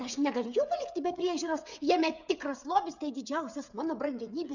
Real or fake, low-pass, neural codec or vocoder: fake; 7.2 kHz; codec, 16 kHz, 8 kbps, FreqCodec, smaller model